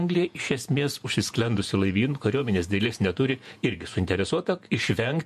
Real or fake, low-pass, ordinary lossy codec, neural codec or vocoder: fake; 14.4 kHz; MP3, 64 kbps; vocoder, 48 kHz, 128 mel bands, Vocos